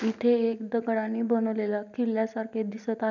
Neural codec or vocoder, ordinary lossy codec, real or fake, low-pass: none; none; real; 7.2 kHz